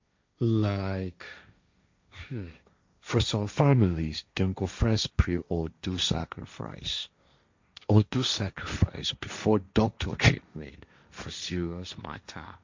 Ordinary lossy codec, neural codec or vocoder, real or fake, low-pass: MP3, 64 kbps; codec, 16 kHz, 1.1 kbps, Voila-Tokenizer; fake; 7.2 kHz